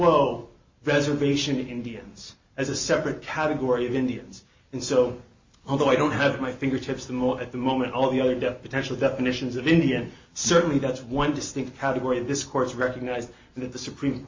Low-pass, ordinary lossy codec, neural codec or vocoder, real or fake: 7.2 kHz; MP3, 32 kbps; none; real